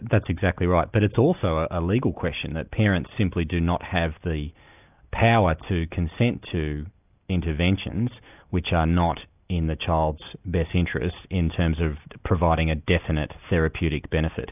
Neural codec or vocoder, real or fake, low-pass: none; real; 3.6 kHz